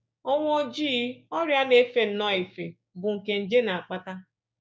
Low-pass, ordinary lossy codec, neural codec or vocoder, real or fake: none; none; codec, 16 kHz, 6 kbps, DAC; fake